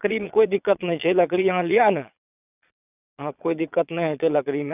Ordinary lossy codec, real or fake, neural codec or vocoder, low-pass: none; fake; vocoder, 22.05 kHz, 80 mel bands, Vocos; 3.6 kHz